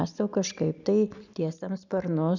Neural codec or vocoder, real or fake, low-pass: none; real; 7.2 kHz